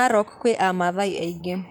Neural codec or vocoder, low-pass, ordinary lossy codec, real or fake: vocoder, 44.1 kHz, 128 mel bands, Pupu-Vocoder; 19.8 kHz; none; fake